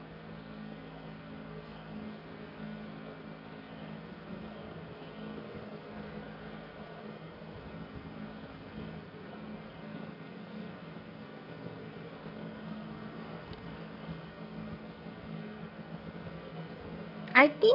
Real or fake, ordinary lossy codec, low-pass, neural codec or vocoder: fake; none; 5.4 kHz; codec, 44.1 kHz, 2.6 kbps, SNAC